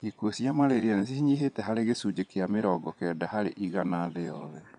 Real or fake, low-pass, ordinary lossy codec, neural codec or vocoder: fake; 9.9 kHz; none; vocoder, 22.05 kHz, 80 mel bands, Vocos